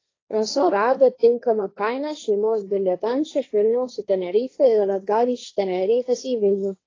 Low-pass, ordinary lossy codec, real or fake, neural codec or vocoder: 7.2 kHz; AAC, 32 kbps; fake; codec, 16 kHz, 1.1 kbps, Voila-Tokenizer